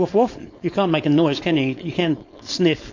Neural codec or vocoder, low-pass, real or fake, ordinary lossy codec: codec, 16 kHz, 4.8 kbps, FACodec; 7.2 kHz; fake; AAC, 48 kbps